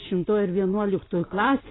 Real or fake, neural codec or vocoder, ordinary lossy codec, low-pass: real; none; AAC, 16 kbps; 7.2 kHz